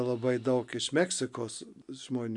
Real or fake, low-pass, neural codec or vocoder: real; 10.8 kHz; none